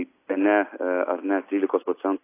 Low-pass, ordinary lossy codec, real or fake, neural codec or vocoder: 3.6 kHz; AAC, 24 kbps; real; none